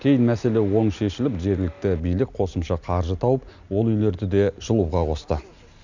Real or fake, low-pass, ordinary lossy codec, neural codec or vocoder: real; 7.2 kHz; none; none